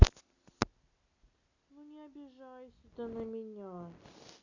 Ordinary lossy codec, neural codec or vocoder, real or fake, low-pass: none; none; real; 7.2 kHz